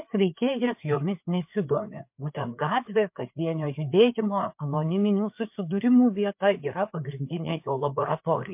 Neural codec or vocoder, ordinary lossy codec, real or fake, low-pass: codec, 16 kHz, 4 kbps, FreqCodec, larger model; MP3, 32 kbps; fake; 3.6 kHz